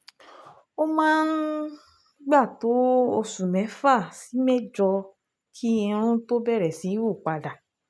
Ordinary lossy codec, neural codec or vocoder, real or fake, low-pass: none; none; real; none